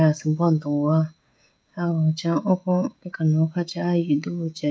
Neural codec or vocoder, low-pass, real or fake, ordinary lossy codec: codec, 16 kHz, 16 kbps, FreqCodec, smaller model; none; fake; none